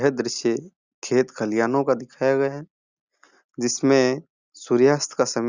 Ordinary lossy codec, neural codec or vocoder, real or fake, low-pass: Opus, 64 kbps; none; real; 7.2 kHz